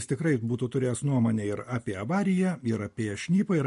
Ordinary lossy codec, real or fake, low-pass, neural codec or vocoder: MP3, 48 kbps; real; 10.8 kHz; none